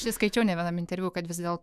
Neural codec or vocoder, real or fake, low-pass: autoencoder, 48 kHz, 128 numbers a frame, DAC-VAE, trained on Japanese speech; fake; 14.4 kHz